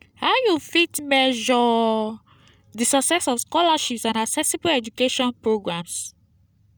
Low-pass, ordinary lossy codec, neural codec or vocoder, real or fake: none; none; none; real